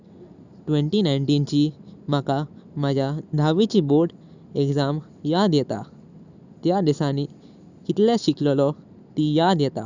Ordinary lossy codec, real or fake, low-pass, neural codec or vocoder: none; real; 7.2 kHz; none